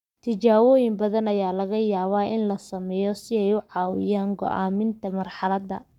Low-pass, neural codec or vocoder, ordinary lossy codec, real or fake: 19.8 kHz; codec, 44.1 kHz, 7.8 kbps, Pupu-Codec; none; fake